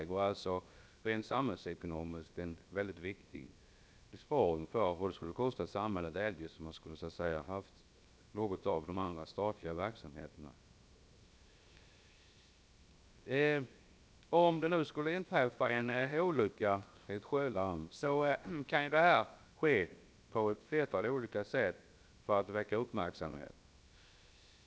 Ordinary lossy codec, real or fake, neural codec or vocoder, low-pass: none; fake; codec, 16 kHz, 0.7 kbps, FocalCodec; none